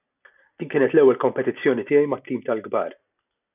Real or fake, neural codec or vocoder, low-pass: real; none; 3.6 kHz